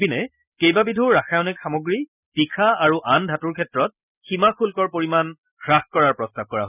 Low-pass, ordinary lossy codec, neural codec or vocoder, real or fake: 3.6 kHz; none; none; real